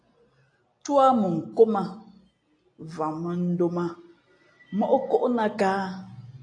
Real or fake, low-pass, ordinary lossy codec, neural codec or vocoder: real; 9.9 kHz; AAC, 48 kbps; none